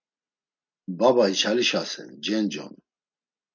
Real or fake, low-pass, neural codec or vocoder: real; 7.2 kHz; none